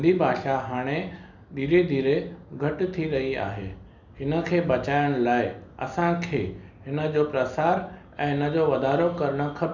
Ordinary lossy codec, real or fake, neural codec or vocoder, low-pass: none; real; none; 7.2 kHz